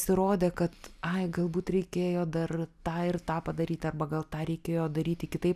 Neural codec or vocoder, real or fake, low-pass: none; real; 14.4 kHz